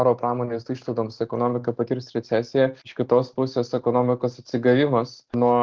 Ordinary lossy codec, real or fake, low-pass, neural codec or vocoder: Opus, 16 kbps; real; 7.2 kHz; none